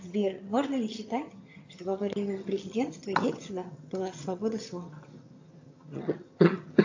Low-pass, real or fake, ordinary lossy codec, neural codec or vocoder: 7.2 kHz; fake; AAC, 48 kbps; vocoder, 22.05 kHz, 80 mel bands, HiFi-GAN